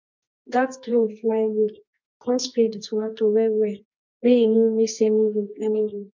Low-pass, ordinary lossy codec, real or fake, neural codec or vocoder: 7.2 kHz; MP3, 48 kbps; fake; codec, 24 kHz, 0.9 kbps, WavTokenizer, medium music audio release